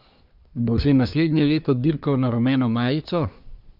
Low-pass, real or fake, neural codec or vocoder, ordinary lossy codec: 5.4 kHz; fake; codec, 24 kHz, 1 kbps, SNAC; Opus, 64 kbps